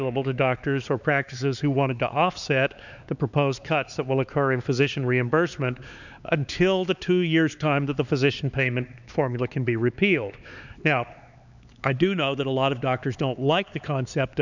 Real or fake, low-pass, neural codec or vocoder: fake; 7.2 kHz; codec, 16 kHz, 4 kbps, X-Codec, HuBERT features, trained on LibriSpeech